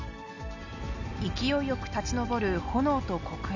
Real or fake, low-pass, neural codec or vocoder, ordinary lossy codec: real; 7.2 kHz; none; none